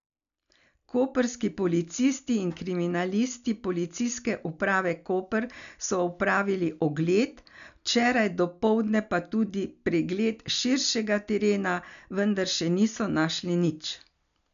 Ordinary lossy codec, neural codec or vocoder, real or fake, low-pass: none; none; real; 7.2 kHz